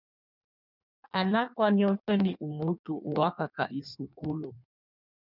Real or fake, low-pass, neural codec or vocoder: fake; 5.4 kHz; codec, 16 kHz in and 24 kHz out, 1.1 kbps, FireRedTTS-2 codec